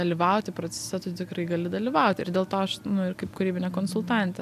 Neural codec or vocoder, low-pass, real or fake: none; 14.4 kHz; real